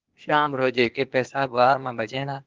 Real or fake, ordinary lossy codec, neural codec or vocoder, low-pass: fake; Opus, 24 kbps; codec, 16 kHz, 0.8 kbps, ZipCodec; 7.2 kHz